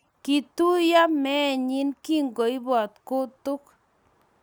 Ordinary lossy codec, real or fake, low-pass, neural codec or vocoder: none; real; none; none